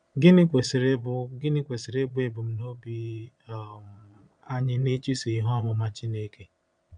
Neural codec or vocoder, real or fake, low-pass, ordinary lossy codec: vocoder, 22.05 kHz, 80 mel bands, Vocos; fake; 9.9 kHz; none